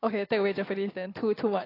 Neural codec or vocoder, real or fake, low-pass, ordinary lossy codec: none; real; 5.4 kHz; AAC, 24 kbps